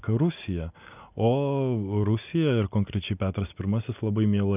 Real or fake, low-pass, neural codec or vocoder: real; 3.6 kHz; none